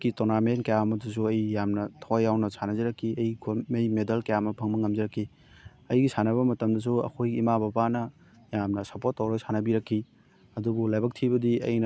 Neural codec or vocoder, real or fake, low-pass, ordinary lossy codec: none; real; none; none